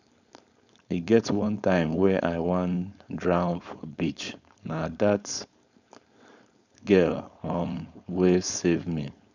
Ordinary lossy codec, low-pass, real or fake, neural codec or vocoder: none; 7.2 kHz; fake; codec, 16 kHz, 4.8 kbps, FACodec